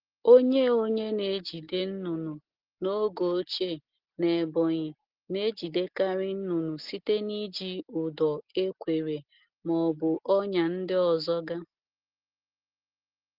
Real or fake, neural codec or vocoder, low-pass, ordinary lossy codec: real; none; 5.4 kHz; Opus, 16 kbps